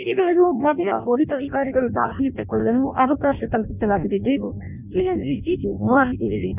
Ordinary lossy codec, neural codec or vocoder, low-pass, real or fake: none; codec, 16 kHz in and 24 kHz out, 0.6 kbps, FireRedTTS-2 codec; 3.6 kHz; fake